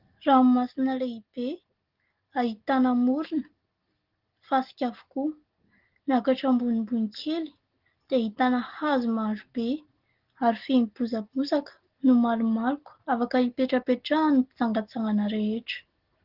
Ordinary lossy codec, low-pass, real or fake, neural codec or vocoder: Opus, 16 kbps; 5.4 kHz; real; none